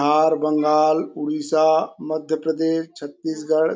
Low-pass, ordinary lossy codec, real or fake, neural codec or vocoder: none; none; real; none